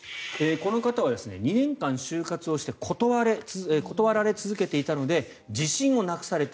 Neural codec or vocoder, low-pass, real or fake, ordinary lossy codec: none; none; real; none